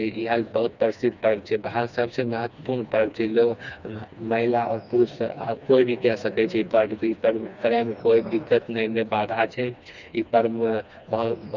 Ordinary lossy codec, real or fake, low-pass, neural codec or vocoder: none; fake; 7.2 kHz; codec, 16 kHz, 2 kbps, FreqCodec, smaller model